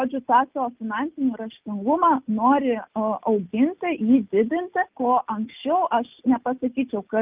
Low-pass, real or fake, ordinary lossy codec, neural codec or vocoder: 3.6 kHz; real; Opus, 24 kbps; none